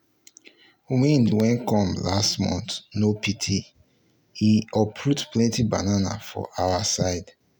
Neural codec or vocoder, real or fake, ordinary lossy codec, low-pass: none; real; none; none